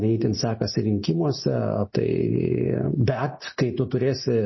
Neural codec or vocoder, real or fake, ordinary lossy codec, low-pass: none; real; MP3, 24 kbps; 7.2 kHz